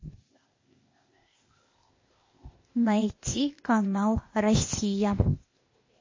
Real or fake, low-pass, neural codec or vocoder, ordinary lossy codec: fake; 7.2 kHz; codec, 16 kHz, 0.8 kbps, ZipCodec; MP3, 32 kbps